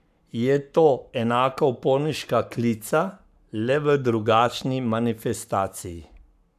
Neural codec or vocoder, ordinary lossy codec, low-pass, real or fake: codec, 44.1 kHz, 7.8 kbps, Pupu-Codec; none; 14.4 kHz; fake